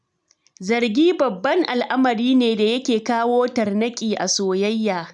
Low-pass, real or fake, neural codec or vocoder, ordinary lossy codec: 10.8 kHz; real; none; none